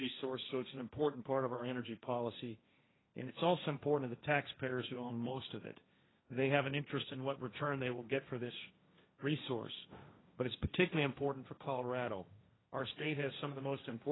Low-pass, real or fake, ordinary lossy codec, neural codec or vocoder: 7.2 kHz; fake; AAC, 16 kbps; codec, 16 kHz, 1.1 kbps, Voila-Tokenizer